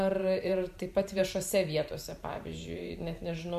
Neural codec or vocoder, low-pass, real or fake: vocoder, 44.1 kHz, 128 mel bands every 512 samples, BigVGAN v2; 14.4 kHz; fake